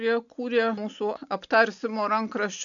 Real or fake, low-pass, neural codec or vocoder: real; 7.2 kHz; none